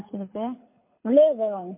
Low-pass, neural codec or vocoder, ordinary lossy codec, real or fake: 3.6 kHz; codec, 24 kHz, 6 kbps, HILCodec; MP3, 24 kbps; fake